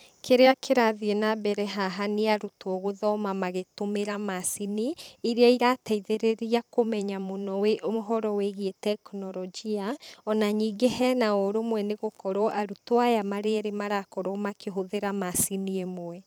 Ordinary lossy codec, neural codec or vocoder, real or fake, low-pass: none; vocoder, 44.1 kHz, 128 mel bands every 512 samples, BigVGAN v2; fake; none